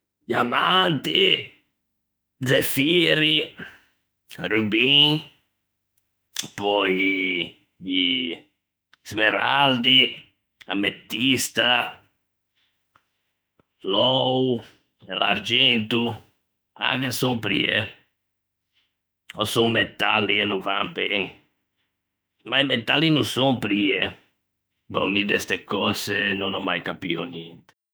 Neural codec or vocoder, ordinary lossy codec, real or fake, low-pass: autoencoder, 48 kHz, 32 numbers a frame, DAC-VAE, trained on Japanese speech; none; fake; none